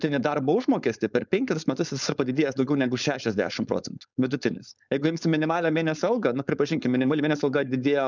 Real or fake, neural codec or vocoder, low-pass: fake; codec, 16 kHz, 4.8 kbps, FACodec; 7.2 kHz